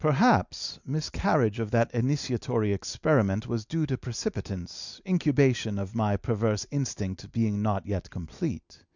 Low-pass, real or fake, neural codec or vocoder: 7.2 kHz; real; none